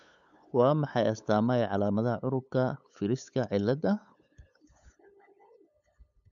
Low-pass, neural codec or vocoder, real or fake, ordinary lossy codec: 7.2 kHz; codec, 16 kHz, 8 kbps, FunCodec, trained on LibriTTS, 25 frames a second; fake; none